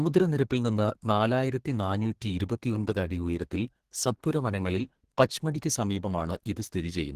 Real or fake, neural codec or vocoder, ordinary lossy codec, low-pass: fake; codec, 32 kHz, 1.9 kbps, SNAC; Opus, 16 kbps; 14.4 kHz